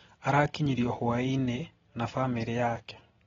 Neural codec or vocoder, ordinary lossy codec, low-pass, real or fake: none; AAC, 24 kbps; 7.2 kHz; real